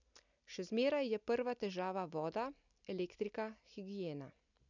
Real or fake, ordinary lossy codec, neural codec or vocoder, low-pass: real; none; none; 7.2 kHz